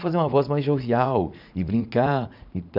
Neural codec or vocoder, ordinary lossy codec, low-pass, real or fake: vocoder, 44.1 kHz, 80 mel bands, Vocos; none; 5.4 kHz; fake